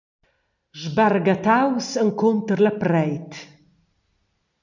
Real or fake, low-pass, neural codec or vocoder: real; 7.2 kHz; none